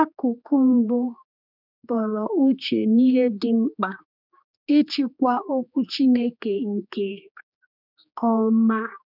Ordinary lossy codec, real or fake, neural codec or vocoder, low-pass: none; fake; codec, 16 kHz, 2 kbps, X-Codec, HuBERT features, trained on general audio; 5.4 kHz